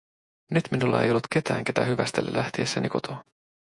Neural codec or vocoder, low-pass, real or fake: vocoder, 48 kHz, 128 mel bands, Vocos; 10.8 kHz; fake